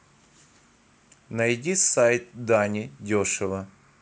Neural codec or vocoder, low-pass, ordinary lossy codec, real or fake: none; none; none; real